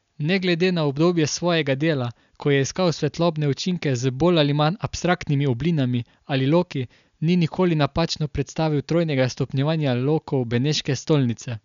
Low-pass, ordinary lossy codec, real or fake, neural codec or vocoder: 7.2 kHz; none; real; none